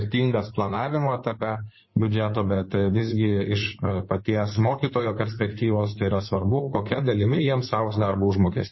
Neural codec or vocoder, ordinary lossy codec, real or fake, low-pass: codec, 16 kHz in and 24 kHz out, 2.2 kbps, FireRedTTS-2 codec; MP3, 24 kbps; fake; 7.2 kHz